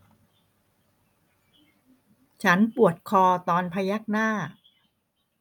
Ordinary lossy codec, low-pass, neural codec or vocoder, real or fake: none; 19.8 kHz; none; real